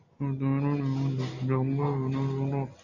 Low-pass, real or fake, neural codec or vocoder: 7.2 kHz; real; none